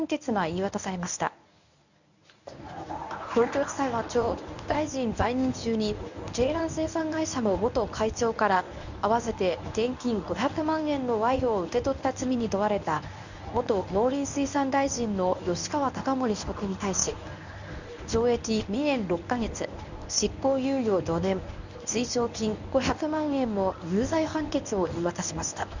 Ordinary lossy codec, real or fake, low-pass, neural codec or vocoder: none; fake; 7.2 kHz; codec, 24 kHz, 0.9 kbps, WavTokenizer, medium speech release version 1